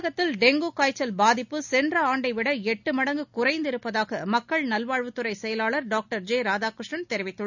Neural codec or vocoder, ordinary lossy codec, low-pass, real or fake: none; none; 7.2 kHz; real